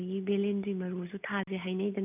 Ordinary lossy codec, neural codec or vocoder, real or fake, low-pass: none; none; real; 3.6 kHz